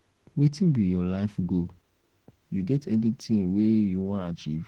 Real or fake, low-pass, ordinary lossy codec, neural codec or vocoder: fake; 19.8 kHz; Opus, 16 kbps; autoencoder, 48 kHz, 32 numbers a frame, DAC-VAE, trained on Japanese speech